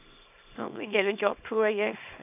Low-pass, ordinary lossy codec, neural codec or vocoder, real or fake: 3.6 kHz; none; codec, 24 kHz, 0.9 kbps, WavTokenizer, small release; fake